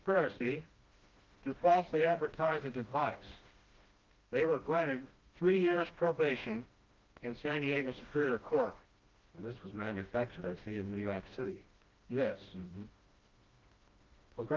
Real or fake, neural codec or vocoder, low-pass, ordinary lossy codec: fake; codec, 16 kHz, 1 kbps, FreqCodec, smaller model; 7.2 kHz; Opus, 32 kbps